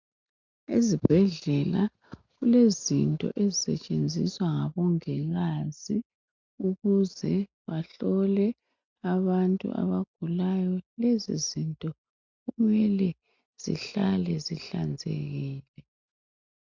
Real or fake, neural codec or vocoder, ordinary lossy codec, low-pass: real; none; MP3, 64 kbps; 7.2 kHz